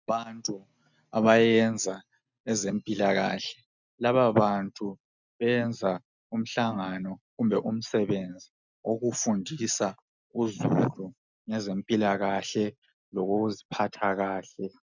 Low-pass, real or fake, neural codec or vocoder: 7.2 kHz; real; none